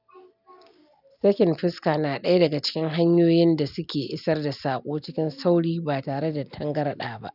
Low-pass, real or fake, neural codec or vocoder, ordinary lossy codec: 5.4 kHz; real; none; none